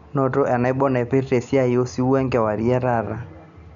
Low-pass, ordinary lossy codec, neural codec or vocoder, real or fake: 7.2 kHz; none; none; real